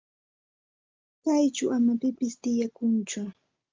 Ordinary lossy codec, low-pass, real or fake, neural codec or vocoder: Opus, 24 kbps; 7.2 kHz; real; none